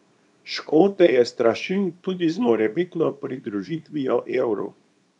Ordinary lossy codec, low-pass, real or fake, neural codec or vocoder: none; 10.8 kHz; fake; codec, 24 kHz, 0.9 kbps, WavTokenizer, small release